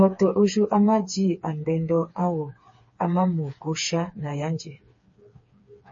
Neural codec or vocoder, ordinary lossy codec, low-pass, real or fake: codec, 16 kHz, 4 kbps, FreqCodec, smaller model; MP3, 32 kbps; 7.2 kHz; fake